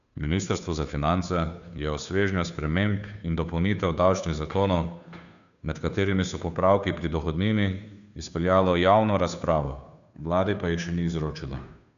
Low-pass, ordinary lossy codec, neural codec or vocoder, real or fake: 7.2 kHz; none; codec, 16 kHz, 2 kbps, FunCodec, trained on Chinese and English, 25 frames a second; fake